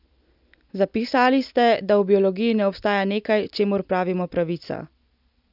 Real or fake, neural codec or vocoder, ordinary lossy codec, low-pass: real; none; none; 5.4 kHz